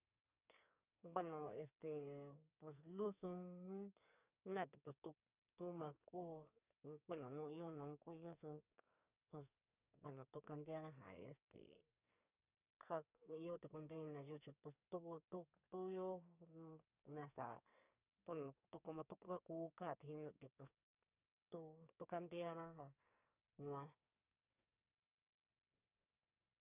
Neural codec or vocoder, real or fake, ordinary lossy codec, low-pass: codec, 44.1 kHz, 2.6 kbps, SNAC; fake; none; 3.6 kHz